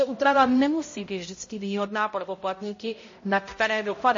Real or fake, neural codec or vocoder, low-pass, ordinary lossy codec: fake; codec, 16 kHz, 0.5 kbps, X-Codec, HuBERT features, trained on balanced general audio; 7.2 kHz; MP3, 32 kbps